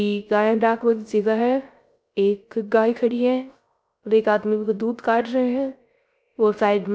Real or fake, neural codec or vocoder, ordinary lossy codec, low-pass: fake; codec, 16 kHz, 0.3 kbps, FocalCodec; none; none